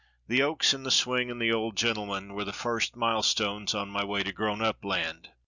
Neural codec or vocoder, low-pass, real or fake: none; 7.2 kHz; real